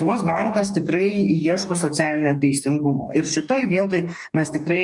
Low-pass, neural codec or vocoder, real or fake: 10.8 kHz; codec, 44.1 kHz, 2.6 kbps, DAC; fake